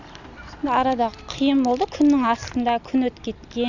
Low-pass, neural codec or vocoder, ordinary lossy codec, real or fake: 7.2 kHz; none; none; real